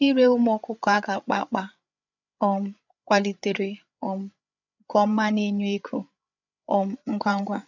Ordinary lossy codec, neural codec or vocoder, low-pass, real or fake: none; codec, 16 kHz, 8 kbps, FreqCodec, larger model; 7.2 kHz; fake